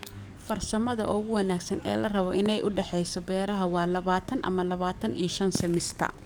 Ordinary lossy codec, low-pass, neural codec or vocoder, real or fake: none; none; codec, 44.1 kHz, 7.8 kbps, DAC; fake